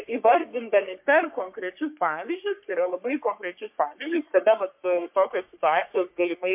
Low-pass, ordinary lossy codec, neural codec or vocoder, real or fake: 3.6 kHz; MP3, 32 kbps; codec, 44.1 kHz, 3.4 kbps, Pupu-Codec; fake